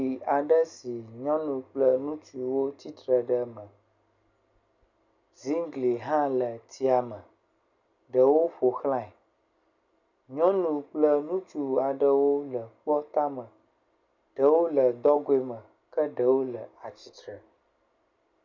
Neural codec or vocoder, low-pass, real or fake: none; 7.2 kHz; real